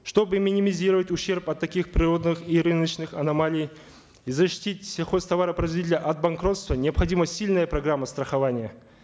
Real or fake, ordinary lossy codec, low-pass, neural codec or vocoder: real; none; none; none